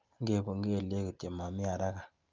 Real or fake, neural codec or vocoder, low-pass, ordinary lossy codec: real; none; 7.2 kHz; Opus, 24 kbps